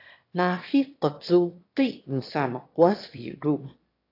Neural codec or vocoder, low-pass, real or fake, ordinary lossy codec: autoencoder, 22.05 kHz, a latent of 192 numbers a frame, VITS, trained on one speaker; 5.4 kHz; fake; AAC, 32 kbps